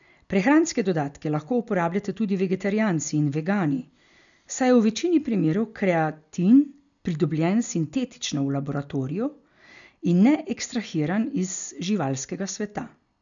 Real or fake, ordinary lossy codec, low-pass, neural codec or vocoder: real; none; 7.2 kHz; none